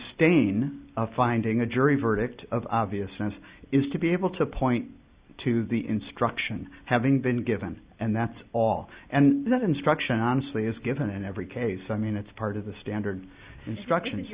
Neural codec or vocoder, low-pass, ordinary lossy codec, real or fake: none; 3.6 kHz; Opus, 64 kbps; real